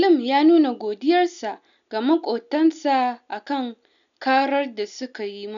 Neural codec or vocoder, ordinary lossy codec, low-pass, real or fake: none; none; 7.2 kHz; real